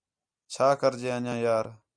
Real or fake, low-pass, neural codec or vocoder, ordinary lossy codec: real; 9.9 kHz; none; MP3, 96 kbps